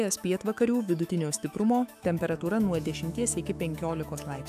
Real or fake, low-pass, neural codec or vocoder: fake; 14.4 kHz; autoencoder, 48 kHz, 128 numbers a frame, DAC-VAE, trained on Japanese speech